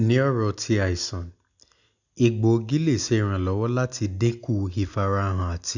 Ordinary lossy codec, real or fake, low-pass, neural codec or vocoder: none; real; 7.2 kHz; none